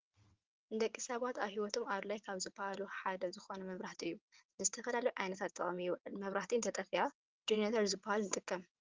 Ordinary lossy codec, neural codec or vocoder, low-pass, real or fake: Opus, 32 kbps; vocoder, 22.05 kHz, 80 mel bands, WaveNeXt; 7.2 kHz; fake